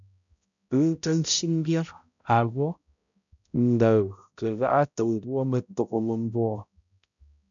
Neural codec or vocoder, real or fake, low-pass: codec, 16 kHz, 0.5 kbps, X-Codec, HuBERT features, trained on balanced general audio; fake; 7.2 kHz